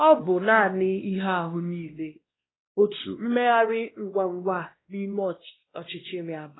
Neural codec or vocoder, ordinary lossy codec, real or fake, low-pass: codec, 16 kHz, 1 kbps, X-Codec, WavLM features, trained on Multilingual LibriSpeech; AAC, 16 kbps; fake; 7.2 kHz